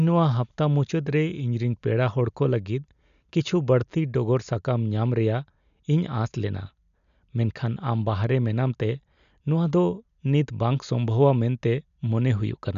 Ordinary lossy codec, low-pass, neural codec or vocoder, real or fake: none; 7.2 kHz; none; real